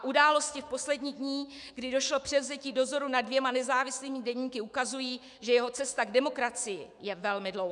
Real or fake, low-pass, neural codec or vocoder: fake; 10.8 kHz; autoencoder, 48 kHz, 128 numbers a frame, DAC-VAE, trained on Japanese speech